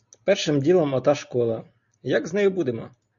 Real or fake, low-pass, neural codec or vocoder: real; 7.2 kHz; none